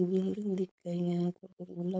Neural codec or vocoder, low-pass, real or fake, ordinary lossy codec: codec, 16 kHz, 4.8 kbps, FACodec; none; fake; none